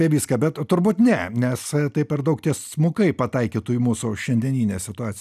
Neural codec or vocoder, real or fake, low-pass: none; real; 14.4 kHz